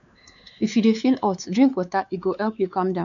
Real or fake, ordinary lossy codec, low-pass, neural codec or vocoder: fake; none; 7.2 kHz; codec, 16 kHz, 4 kbps, X-Codec, WavLM features, trained on Multilingual LibriSpeech